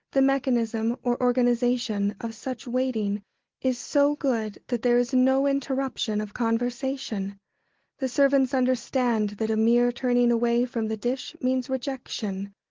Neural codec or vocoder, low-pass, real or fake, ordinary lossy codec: none; 7.2 kHz; real; Opus, 16 kbps